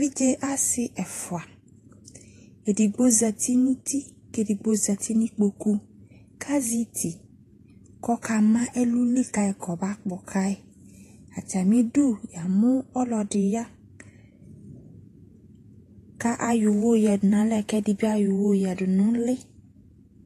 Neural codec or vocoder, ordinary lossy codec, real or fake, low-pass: vocoder, 48 kHz, 128 mel bands, Vocos; AAC, 48 kbps; fake; 14.4 kHz